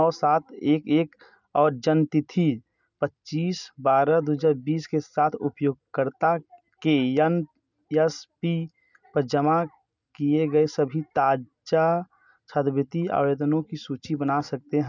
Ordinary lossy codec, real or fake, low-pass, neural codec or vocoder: none; real; 7.2 kHz; none